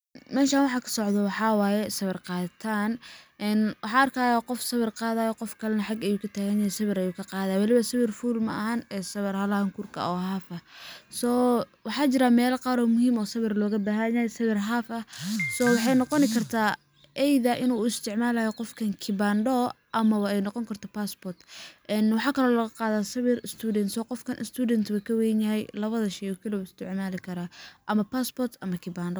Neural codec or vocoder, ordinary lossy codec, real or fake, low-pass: none; none; real; none